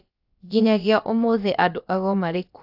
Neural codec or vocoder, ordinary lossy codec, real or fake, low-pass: codec, 16 kHz, about 1 kbps, DyCAST, with the encoder's durations; AAC, 48 kbps; fake; 5.4 kHz